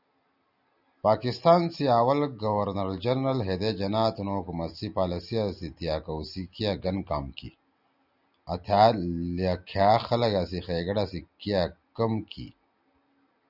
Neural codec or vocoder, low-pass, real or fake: none; 5.4 kHz; real